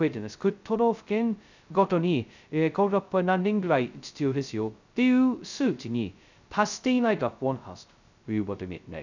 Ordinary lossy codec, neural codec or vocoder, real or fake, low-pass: none; codec, 16 kHz, 0.2 kbps, FocalCodec; fake; 7.2 kHz